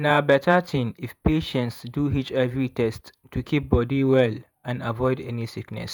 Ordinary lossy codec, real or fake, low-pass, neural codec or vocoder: none; fake; none; vocoder, 48 kHz, 128 mel bands, Vocos